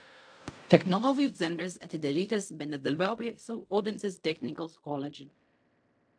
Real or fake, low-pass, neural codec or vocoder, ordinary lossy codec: fake; 9.9 kHz; codec, 16 kHz in and 24 kHz out, 0.4 kbps, LongCat-Audio-Codec, fine tuned four codebook decoder; AAC, 64 kbps